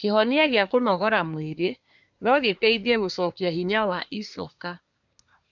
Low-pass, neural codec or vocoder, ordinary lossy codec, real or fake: 7.2 kHz; codec, 24 kHz, 1 kbps, SNAC; none; fake